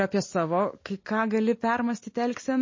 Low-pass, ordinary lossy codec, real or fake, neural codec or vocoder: 7.2 kHz; MP3, 32 kbps; real; none